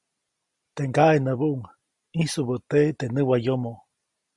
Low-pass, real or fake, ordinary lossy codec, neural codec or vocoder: 10.8 kHz; real; Opus, 64 kbps; none